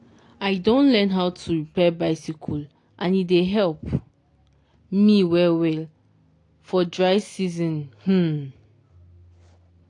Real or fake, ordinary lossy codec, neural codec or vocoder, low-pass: real; AAC, 48 kbps; none; 10.8 kHz